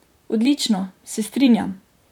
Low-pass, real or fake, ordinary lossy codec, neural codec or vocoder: 19.8 kHz; fake; none; vocoder, 44.1 kHz, 128 mel bands, Pupu-Vocoder